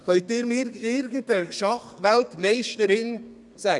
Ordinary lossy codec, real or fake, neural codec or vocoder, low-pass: none; fake; codec, 32 kHz, 1.9 kbps, SNAC; 10.8 kHz